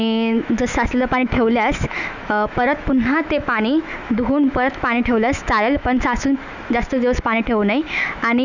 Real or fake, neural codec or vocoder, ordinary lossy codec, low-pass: fake; autoencoder, 48 kHz, 128 numbers a frame, DAC-VAE, trained on Japanese speech; none; 7.2 kHz